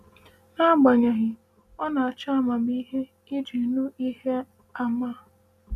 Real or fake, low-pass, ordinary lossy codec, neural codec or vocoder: real; 14.4 kHz; none; none